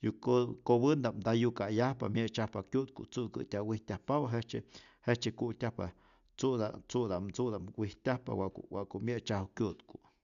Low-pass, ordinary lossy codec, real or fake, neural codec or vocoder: 7.2 kHz; none; real; none